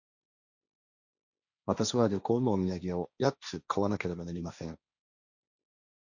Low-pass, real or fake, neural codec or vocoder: 7.2 kHz; fake; codec, 16 kHz, 1.1 kbps, Voila-Tokenizer